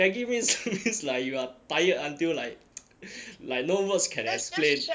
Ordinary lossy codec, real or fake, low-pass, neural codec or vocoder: none; real; none; none